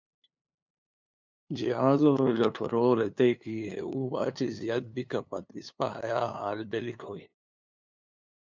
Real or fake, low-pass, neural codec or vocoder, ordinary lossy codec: fake; 7.2 kHz; codec, 16 kHz, 2 kbps, FunCodec, trained on LibriTTS, 25 frames a second; MP3, 64 kbps